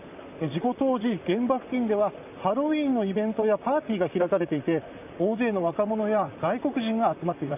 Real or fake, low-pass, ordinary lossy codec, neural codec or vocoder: fake; 3.6 kHz; none; vocoder, 44.1 kHz, 128 mel bands, Pupu-Vocoder